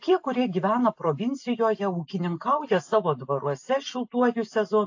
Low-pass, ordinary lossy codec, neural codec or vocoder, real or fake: 7.2 kHz; AAC, 48 kbps; none; real